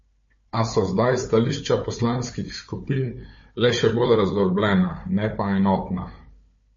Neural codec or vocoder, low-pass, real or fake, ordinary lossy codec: codec, 16 kHz, 4 kbps, FunCodec, trained on Chinese and English, 50 frames a second; 7.2 kHz; fake; MP3, 32 kbps